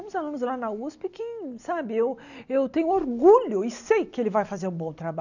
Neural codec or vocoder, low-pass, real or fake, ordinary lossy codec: none; 7.2 kHz; real; none